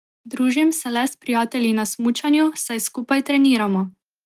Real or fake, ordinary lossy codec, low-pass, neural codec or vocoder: real; Opus, 24 kbps; 14.4 kHz; none